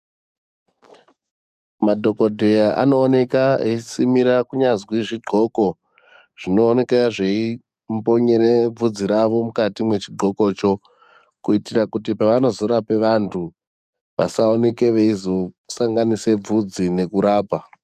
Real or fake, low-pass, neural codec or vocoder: fake; 14.4 kHz; codec, 44.1 kHz, 7.8 kbps, DAC